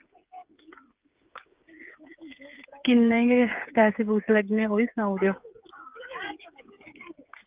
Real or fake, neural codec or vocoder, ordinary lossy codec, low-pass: fake; codec, 16 kHz, 8 kbps, FreqCodec, smaller model; Opus, 32 kbps; 3.6 kHz